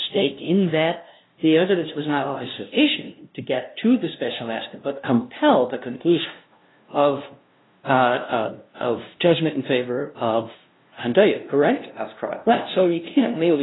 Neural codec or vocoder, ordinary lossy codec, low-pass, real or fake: codec, 16 kHz, 0.5 kbps, FunCodec, trained on LibriTTS, 25 frames a second; AAC, 16 kbps; 7.2 kHz; fake